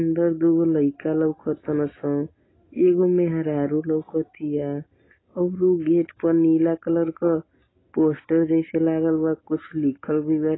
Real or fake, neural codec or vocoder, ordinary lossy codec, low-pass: real; none; AAC, 16 kbps; 7.2 kHz